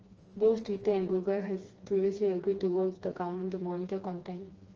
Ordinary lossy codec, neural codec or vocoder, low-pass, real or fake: Opus, 24 kbps; codec, 16 kHz, 2 kbps, FreqCodec, smaller model; 7.2 kHz; fake